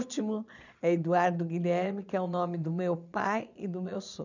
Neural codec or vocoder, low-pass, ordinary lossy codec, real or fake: vocoder, 22.05 kHz, 80 mel bands, Vocos; 7.2 kHz; none; fake